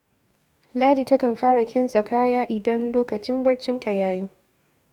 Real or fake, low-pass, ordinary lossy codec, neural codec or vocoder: fake; 19.8 kHz; none; codec, 44.1 kHz, 2.6 kbps, DAC